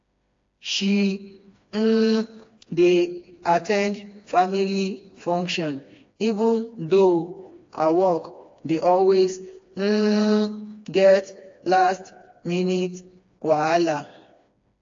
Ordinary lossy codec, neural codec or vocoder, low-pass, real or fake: AAC, 48 kbps; codec, 16 kHz, 2 kbps, FreqCodec, smaller model; 7.2 kHz; fake